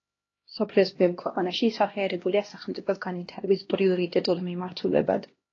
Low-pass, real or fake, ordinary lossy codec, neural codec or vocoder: 7.2 kHz; fake; AAC, 32 kbps; codec, 16 kHz, 1 kbps, X-Codec, HuBERT features, trained on LibriSpeech